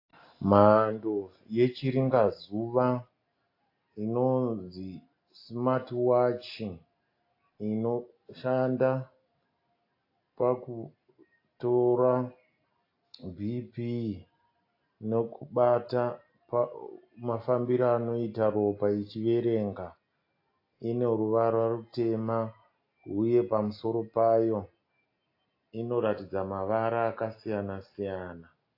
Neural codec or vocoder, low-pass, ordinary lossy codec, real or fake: none; 5.4 kHz; AAC, 32 kbps; real